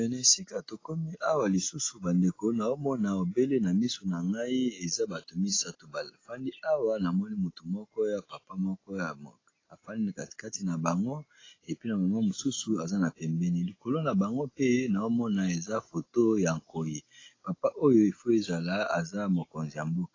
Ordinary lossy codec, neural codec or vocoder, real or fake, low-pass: AAC, 32 kbps; none; real; 7.2 kHz